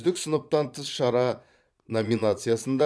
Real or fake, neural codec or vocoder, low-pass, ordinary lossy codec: fake; vocoder, 22.05 kHz, 80 mel bands, Vocos; none; none